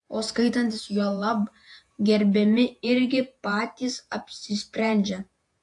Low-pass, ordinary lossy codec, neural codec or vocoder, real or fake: 10.8 kHz; AAC, 64 kbps; vocoder, 48 kHz, 128 mel bands, Vocos; fake